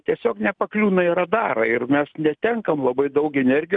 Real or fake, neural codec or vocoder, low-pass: real; none; 9.9 kHz